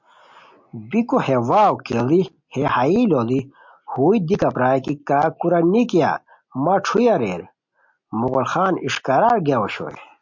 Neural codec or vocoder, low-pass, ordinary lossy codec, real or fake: none; 7.2 kHz; MP3, 48 kbps; real